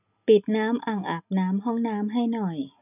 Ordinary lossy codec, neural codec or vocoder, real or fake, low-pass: none; none; real; 3.6 kHz